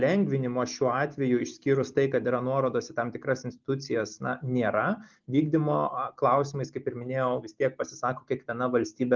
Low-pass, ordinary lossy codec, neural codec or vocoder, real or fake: 7.2 kHz; Opus, 32 kbps; none; real